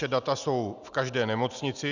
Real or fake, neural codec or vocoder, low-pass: real; none; 7.2 kHz